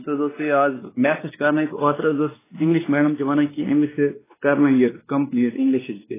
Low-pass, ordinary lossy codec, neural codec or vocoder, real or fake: 3.6 kHz; AAC, 16 kbps; codec, 16 kHz, 2 kbps, X-Codec, WavLM features, trained on Multilingual LibriSpeech; fake